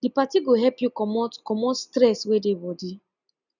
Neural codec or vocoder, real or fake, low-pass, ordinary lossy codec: none; real; 7.2 kHz; none